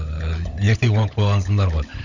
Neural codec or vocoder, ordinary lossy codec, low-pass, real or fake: codec, 16 kHz, 16 kbps, FunCodec, trained on LibriTTS, 50 frames a second; none; 7.2 kHz; fake